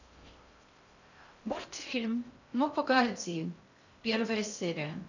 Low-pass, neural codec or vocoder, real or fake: 7.2 kHz; codec, 16 kHz in and 24 kHz out, 0.6 kbps, FocalCodec, streaming, 2048 codes; fake